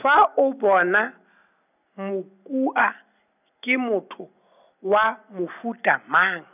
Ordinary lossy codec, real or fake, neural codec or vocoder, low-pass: none; real; none; 3.6 kHz